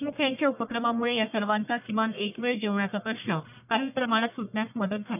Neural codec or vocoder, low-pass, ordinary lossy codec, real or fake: codec, 44.1 kHz, 1.7 kbps, Pupu-Codec; 3.6 kHz; none; fake